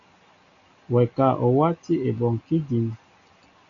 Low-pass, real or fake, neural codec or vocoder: 7.2 kHz; real; none